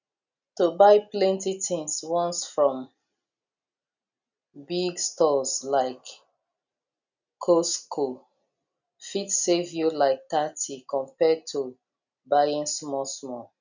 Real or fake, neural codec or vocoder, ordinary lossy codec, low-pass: real; none; none; 7.2 kHz